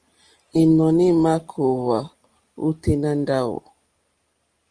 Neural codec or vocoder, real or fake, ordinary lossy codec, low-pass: none; real; Opus, 24 kbps; 9.9 kHz